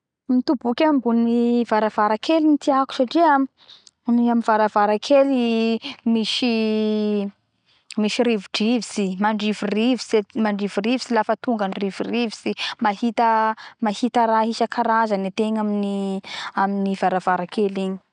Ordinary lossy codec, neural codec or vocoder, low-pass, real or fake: none; none; 9.9 kHz; real